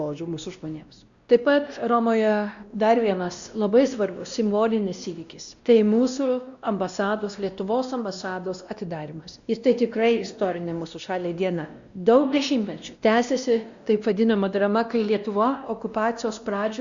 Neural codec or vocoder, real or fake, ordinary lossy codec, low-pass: codec, 16 kHz, 1 kbps, X-Codec, WavLM features, trained on Multilingual LibriSpeech; fake; Opus, 64 kbps; 7.2 kHz